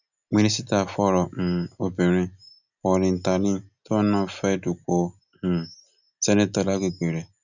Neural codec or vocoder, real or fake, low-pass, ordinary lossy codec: none; real; 7.2 kHz; none